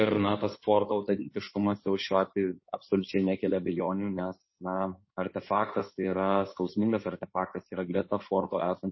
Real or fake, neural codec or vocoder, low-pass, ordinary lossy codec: fake; codec, 16 kHz in and 24 kHz out, 2.2 kbps, FireRedTTS-2 codec; 7.2 kHz; MP3, 24 kbps